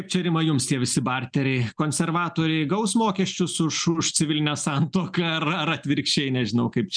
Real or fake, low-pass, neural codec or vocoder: real; 9.9 kHz; none